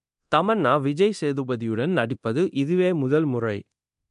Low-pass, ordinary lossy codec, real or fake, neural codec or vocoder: 10.8 kHz; AAC, 96 kbps; fake; codec, 24 kHz, 0.9 kbps, DualCodec